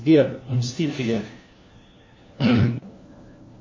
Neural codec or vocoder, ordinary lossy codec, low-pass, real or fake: codec, 16 kHz, 1 kbps, FunCodec, trained on LibriTTS, 50 frames a second; MP3, 32 kbps; 7.2 kHz; fake